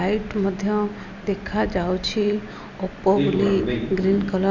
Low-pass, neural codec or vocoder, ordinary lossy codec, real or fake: 7.2 kHz; none; none; real